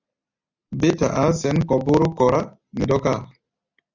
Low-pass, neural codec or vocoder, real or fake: 7.2 kHz; none; real